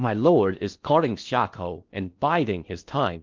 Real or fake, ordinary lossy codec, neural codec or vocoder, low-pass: fake; Opus, 32 kbps; codec, 16 kHz in and 24 kHz out, 0.6 kbps, FocalCodec, streaming, 4096 codes; 7.2 kHz